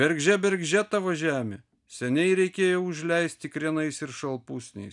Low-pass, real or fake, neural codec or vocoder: 10.8 kHz; real; none